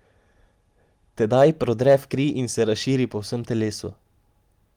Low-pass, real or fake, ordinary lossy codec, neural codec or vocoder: 19.8 kHz; real; Opus, 24 kbps; none